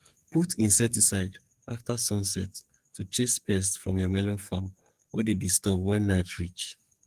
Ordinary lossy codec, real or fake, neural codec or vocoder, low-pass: Opus, 24 kbps; fake; codec, 44.1 kHz, 2.6 kbps, SNAC; 14.4 kHz